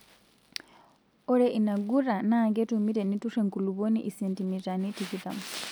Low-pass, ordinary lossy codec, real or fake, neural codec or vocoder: none; none; real; none